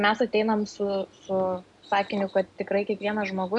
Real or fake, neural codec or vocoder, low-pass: real; none; 10.8 kHz